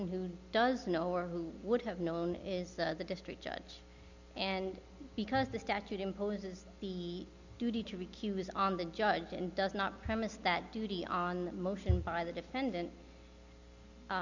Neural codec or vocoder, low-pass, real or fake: none; 7.2 kHz; real